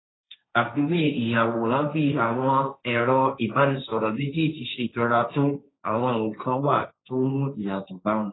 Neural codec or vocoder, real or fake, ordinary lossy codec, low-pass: codec, 16 kHz, 1.1 kbps, Voila-Tokenizer; fake; AAC, 16 kbps; 7.2 kHz